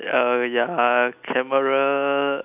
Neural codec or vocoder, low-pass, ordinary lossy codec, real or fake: none; 3.6 kHz; none; real